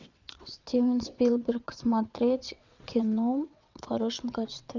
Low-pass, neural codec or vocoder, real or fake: 7.2 kHz; vocoder, 22.05 kHz, 80 mel bands, WaveNeXt; fake